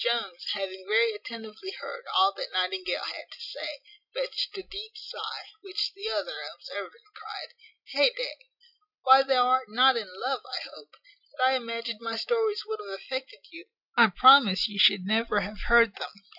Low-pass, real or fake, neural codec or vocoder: 5.4 kHz; real; none